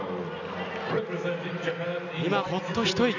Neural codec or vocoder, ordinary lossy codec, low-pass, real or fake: none; none; 7.2 kHz; real